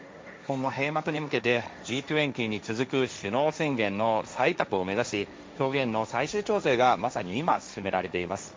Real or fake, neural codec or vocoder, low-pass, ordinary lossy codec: fake; codec, 16 kHz, 1.1 kbps, Voila-Tokenizer; none; none